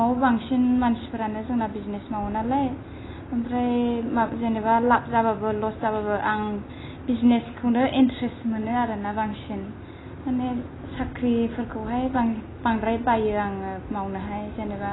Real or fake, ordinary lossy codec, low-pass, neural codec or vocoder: real; AAC, 16 kbps; 7.2 kHz; none